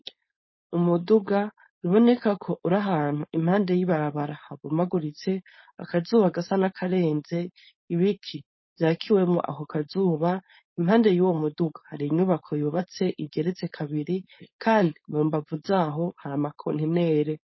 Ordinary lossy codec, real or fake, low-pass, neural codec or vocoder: MP3, 24 kbps; fake; 7.2 kHz; codec, 16 kHz, 4.8 kbps, FACodec